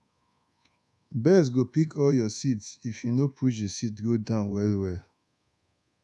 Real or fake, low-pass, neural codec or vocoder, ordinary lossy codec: fake; none; codec, 24 kHz, 1.2 kbps, DualCodec; none